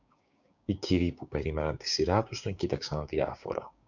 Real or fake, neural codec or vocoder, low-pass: fake; codec, 16 kHz, 6 kbps, DAC; 7.2 kHz